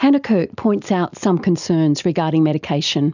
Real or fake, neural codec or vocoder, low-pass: real; none; 7.2 kHz